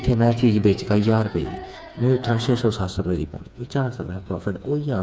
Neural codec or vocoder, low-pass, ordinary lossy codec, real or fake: codec, 16 kHz, 4 kbps, FreqCodec, smaller model; none; none; fake